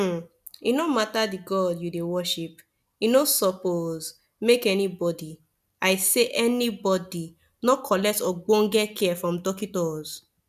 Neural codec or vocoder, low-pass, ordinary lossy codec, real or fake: none; 14.4 kHz; none; real